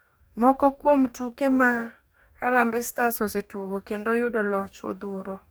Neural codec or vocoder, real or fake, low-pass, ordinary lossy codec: codec, 44.1 kHz, 2.6 kbps, DAC; fake; none; none